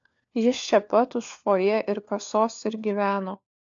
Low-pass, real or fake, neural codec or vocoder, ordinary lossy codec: 7.2 kHz; fake; codec, 16 kHz, 4 kbps, FunCodec, trained on LibriTTS, 50 frames a second; AAC, 64 kbps